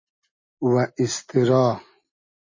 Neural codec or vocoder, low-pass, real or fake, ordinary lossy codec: none; 7.2 kHz; real; MP3, 32 kbps